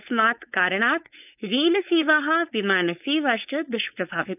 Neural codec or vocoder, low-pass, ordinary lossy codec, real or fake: codec, 16 kHz, 4.8 kbps, FACodec; 3.6 kHz; none; fake